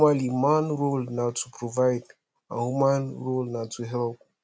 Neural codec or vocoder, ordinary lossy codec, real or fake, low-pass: none; none; real; none